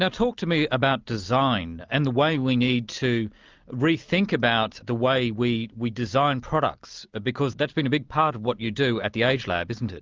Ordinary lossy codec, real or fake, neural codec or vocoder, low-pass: Opus, 24 kbps; real; none; 7.2 kHz